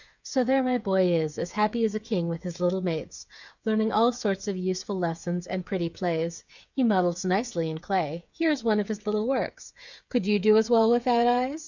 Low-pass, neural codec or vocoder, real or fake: 7.2 kHz; codec, 16 kHz, 8 kbps, FreqCodec, smaller model; fake